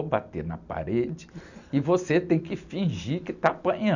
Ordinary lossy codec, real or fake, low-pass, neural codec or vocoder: none; real; 7.2 kHz; none